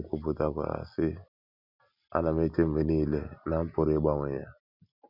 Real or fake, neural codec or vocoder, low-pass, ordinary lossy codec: real; none; 5.4 kHz; none